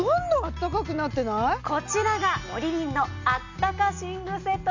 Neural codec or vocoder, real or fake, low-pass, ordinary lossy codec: none; real; 7.2 kHz; none